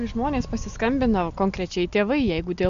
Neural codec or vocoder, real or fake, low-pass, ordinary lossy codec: none; real; 7.2 kHz; Opus, 64 kbps